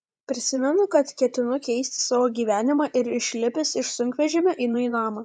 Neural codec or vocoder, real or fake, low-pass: vocoder, 24 kHz, 100 mel bands, Vocos; fake; 9.9 kHz